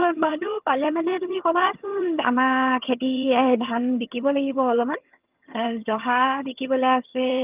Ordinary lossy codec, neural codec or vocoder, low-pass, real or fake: Opus, 24 kbps; vocoder, 22.05 kHz, 80 mel bands, HiFi-GAN; 3.6 kHz; fake